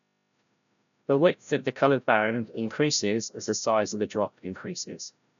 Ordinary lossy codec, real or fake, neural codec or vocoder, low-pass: none; fake; codec, 16 kHz, 0.5 kbps, FreqCodec, larger model; 7.2 kHz